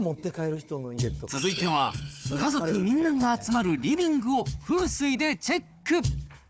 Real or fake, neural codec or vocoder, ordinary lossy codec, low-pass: fake; codec, 16 kHz, 4 kbps, FunCodec, trained on Chinese and English, 50 frames a second; none; none